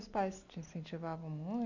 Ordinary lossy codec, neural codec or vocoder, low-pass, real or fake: none; none; 7.2 kHz; real